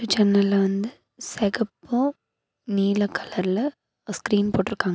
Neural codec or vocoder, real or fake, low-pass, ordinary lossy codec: none; real; none; none